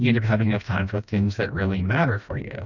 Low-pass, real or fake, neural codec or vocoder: 7.2 kHz; fake; codec, 16 kHz, 1 kbps, FreqCodec, smaller model